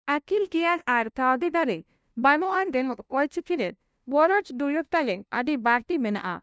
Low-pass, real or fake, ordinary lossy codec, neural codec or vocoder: none; fake; none; codec, 16 kHz, 0.5 kbps, FunCodec, trained on LibriTTS, 25 frames a second